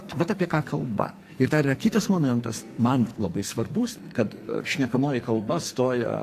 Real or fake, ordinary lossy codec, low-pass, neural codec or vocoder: fake; AAC, 64 kbps; 14.4 kHz; codec, 44.1 kHz, 2.6 kbps, SNAC